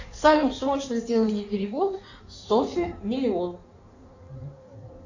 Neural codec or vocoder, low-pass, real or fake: codec, 16 kHz in and 24 kHz out, 1.1 kbps, FireRedTTS-2 codec; 7.2 kHz; fake